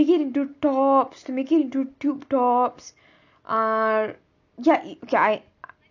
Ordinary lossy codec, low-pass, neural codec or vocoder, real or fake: none; 7.2 kHz; none; real